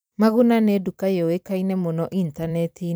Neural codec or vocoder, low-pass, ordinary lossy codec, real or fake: vocoder, 44.1 kHz, 128 mel bands every 256 samples, BigVGAN v2; none; none; fake